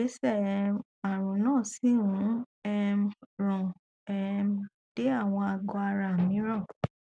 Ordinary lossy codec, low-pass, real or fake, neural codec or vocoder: none; 9.9 kHz; real; none